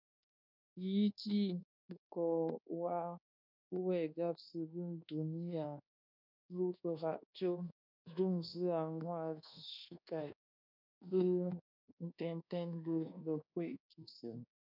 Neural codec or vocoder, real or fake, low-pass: codec, 24 kHz, 1.2 kbps, DualCodec; fake; 5.4 kHz